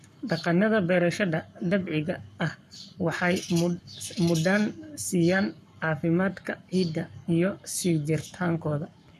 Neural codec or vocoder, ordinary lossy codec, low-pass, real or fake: codec, 44.1 kHz, 7.8 kbps, Pupu-Codec; none; 14.4 kHz; fake